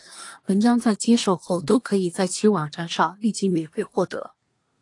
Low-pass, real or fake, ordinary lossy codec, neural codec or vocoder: 10.8 kHz; fake; AAC, 48 kbps; codec, 24 kHz, 1 kbps, SNAC